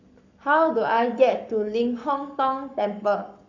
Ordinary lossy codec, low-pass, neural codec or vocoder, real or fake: none; 7.2 kHz; codec, 44.1 kHz, 7.8 kbps, Pupu-Codec; fake